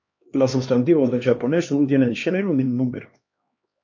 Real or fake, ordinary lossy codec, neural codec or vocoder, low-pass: fake; MP3, 48 kbps; codec, 16 kHz, 2 kbps, X-Codec, HuBERT features, trained on LibriSpeech; 7.2 kHz